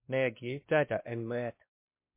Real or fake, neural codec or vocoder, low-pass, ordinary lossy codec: fake; codec, 16 kHz, 1 kbps, X-Codec, WavLM features, trained on Multilingual LibriSpeech; 3.6 kHz; MP3, 24 kbps